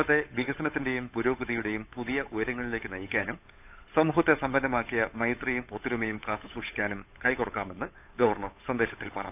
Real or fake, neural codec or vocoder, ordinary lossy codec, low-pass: fake; codec, 16 kHz, 8 kbps, FunCodec, trained on Chinese and English, 25 frames a second; AAC, 32 kbps; 3.6 kHz